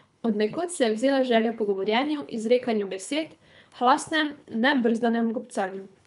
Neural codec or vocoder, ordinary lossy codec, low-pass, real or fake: codec, 24 kHz, 3 kbps, HILCodec; none; 10.8 kHz; fake